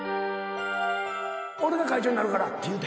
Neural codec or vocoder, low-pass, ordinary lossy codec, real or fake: none; none; none; real